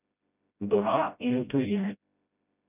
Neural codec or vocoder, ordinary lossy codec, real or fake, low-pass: codec, 16 kHz, 0.5 kbps, FreqCodec, smaller model; none; fake; 3.6 kHz